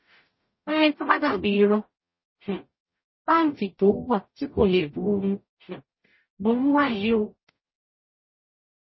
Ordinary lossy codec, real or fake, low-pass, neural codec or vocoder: MP3, 24 kbps; fake; 7.2 kHz; codec, 44.1 kHz, 0.9 kbps, DAC